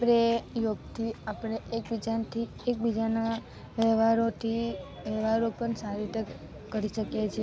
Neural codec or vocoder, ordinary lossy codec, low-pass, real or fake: none; none; none; real